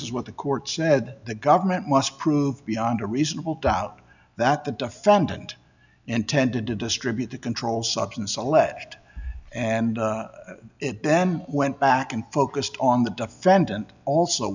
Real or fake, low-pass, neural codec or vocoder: real; 7.2 kHz; none